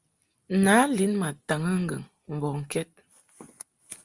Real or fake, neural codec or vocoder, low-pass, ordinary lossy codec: real; none; 10.8 kHz; Opus, 32 kbps